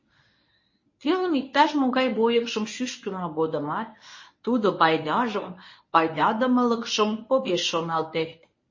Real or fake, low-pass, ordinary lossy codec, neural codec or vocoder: fake; 7.2 kHz; MP3, 32 kbps; codec, 24 kHz, 0.9 kbps, WavTokenizer, medium speech release version 1